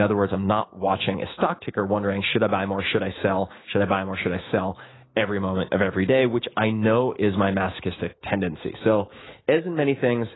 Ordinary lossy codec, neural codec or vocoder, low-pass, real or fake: AAC, 16 kbps; autoencoder, 48 kHz, 128 numbers a frame, DAC-VAE, trained on Japanese speech; 7.2 kHz; fake